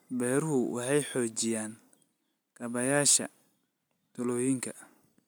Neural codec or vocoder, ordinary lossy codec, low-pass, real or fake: none; none; none; real